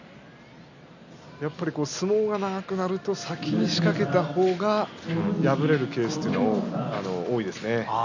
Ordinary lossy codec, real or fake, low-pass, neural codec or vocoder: none; real; 7.2 kHz; none